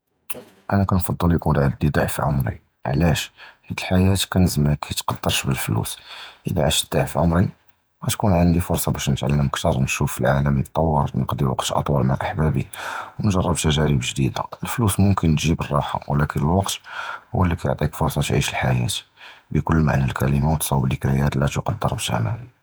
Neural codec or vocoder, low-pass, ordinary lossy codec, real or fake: vocoder, 48 kHz, 128 mel bands, Vocos; none; none; fake